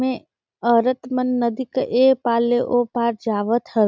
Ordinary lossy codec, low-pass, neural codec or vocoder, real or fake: none; none; none; real